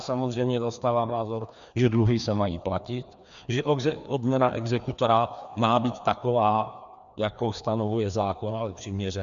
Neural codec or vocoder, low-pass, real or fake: codec, 16 kHz, 2 kbps, FreqCodec, larger model; 7.2 kHz; fake